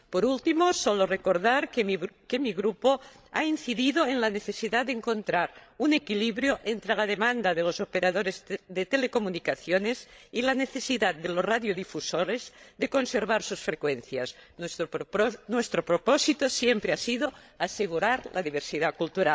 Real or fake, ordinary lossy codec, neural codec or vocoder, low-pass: fake; none; codec, 16 kHz, 16 kbps, FreqCodec, larger model; none